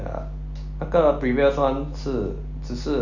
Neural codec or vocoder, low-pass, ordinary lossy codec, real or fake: none; 7.2 kHz; none; real